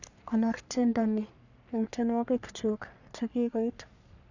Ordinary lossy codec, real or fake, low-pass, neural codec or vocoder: none; fake; 7.2 kHz; codec, 44.1 kHz, 3.4 kbps, Pupu-Codec